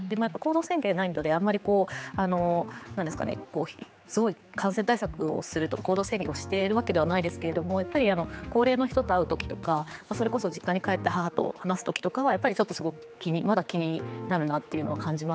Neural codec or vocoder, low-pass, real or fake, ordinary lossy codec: codec, 16 kHz, 4 kbps, X-Codec, HuBERT features, trained on general audio; none; fake; none